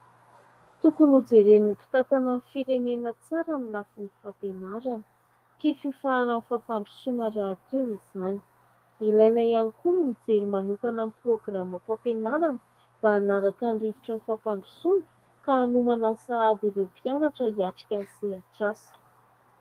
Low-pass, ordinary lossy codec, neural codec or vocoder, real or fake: 14.4 kHz; Opus, 32 kbps; codec, 32 kHz, 1.9 kbps, SNAC; fake